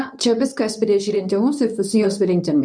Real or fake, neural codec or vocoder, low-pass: fake; codec, 24 kHz, 0.9 kbps, WavTokenizer, medium speech release version 2; 9.9 kHz